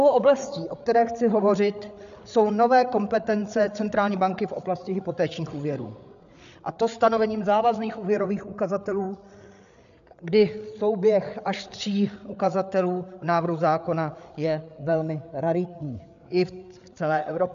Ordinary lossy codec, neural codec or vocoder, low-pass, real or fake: AAC, 96 kbps; codec, 16 kHz, 8 kbps, FreqCodec, larger model; 7.2 kHz; fake